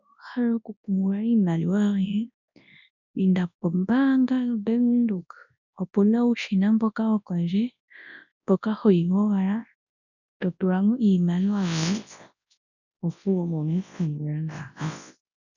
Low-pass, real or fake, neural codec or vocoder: 7.2 kHz; fake; codec, 24 kHz, 0.9 kbps, WavTokenizer, large speech release